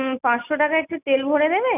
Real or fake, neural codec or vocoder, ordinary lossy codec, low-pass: real; none; none; 3.6 kHz